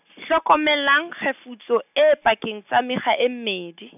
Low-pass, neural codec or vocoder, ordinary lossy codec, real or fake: 3.6 kHz; none; none; real